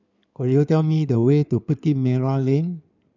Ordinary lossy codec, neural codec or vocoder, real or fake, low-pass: none; codec, 16 kHz in and 24 kHz out, 2.2 kbps, FireRedTTS-2 codec; fake; 7.2 kHz